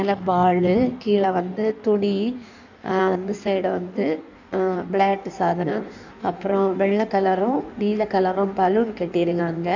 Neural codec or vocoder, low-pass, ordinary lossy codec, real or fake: codec, 16 kHz in and 24 kHz out, 1.1 kbps, FireRedTTS-2 codec; 7.2 kHz; none; fake